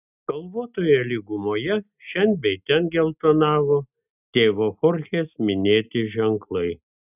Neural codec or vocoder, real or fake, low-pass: none; real; 3.6 kHz